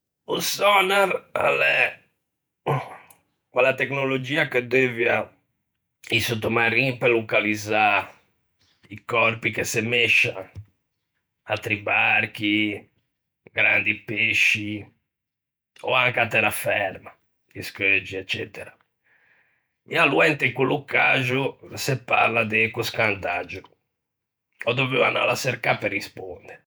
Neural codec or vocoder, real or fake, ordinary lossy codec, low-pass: vocoder, 48 kHz, 128 mel bands, Vocos; fake; none; none